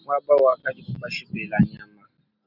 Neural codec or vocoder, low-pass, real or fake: none; 5.4 kHz; real